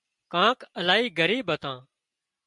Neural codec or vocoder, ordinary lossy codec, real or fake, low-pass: none; MP3, 96 kbps; real; 10.8 kHz